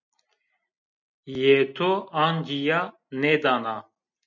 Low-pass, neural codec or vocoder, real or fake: 7.2 kHz; none; real